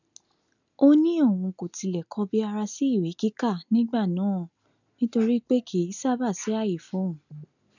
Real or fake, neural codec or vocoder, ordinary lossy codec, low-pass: real; none; none; 7.2 kHz